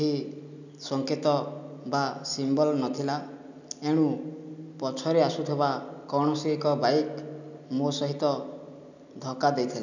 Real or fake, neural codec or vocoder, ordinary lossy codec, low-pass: real; none; none; 7.2 kHz